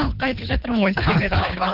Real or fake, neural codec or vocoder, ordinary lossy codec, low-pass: fake; codec, 24 kHz, 3 kbps, HILCodec; Opus, 32 kbps; 5.4 kHz